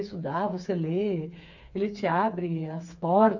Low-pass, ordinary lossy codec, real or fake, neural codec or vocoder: 7.2 kHz; none; fake; codec, 16 kHz, 4 kbps, FreqCodec, smaller model